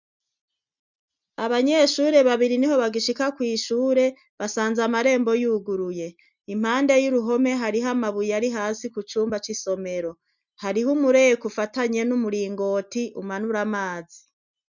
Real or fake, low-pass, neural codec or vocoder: real; 7.2 kHz; none